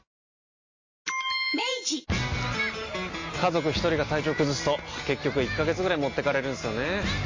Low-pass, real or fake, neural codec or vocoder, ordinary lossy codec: 7.2 kHz; real; none; MP3, 32 kbps